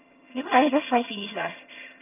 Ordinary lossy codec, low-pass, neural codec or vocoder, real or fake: none; 3.6 kHz; vocoder, 22.05 kHz, 80 mel bands, HiFi-GAN; fake